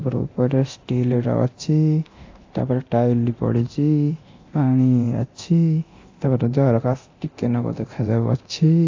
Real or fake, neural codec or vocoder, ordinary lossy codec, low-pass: fake; codec, 24 kHz, 0.9 kbps, DualCodec; AAC, 48 kbps; 7.2 kHz